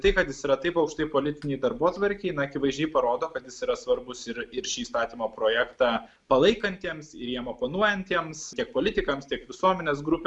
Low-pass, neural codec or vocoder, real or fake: 10.8 kHz; none; real